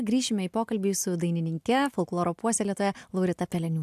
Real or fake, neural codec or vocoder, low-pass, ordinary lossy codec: real; none; 14.4 kHz; AAC, 96 kbps